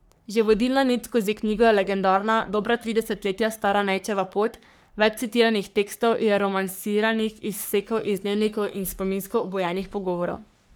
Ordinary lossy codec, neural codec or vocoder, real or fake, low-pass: none; codec, 44.1 kHz, 3.4 kbps, Pupu-Codec; fake; none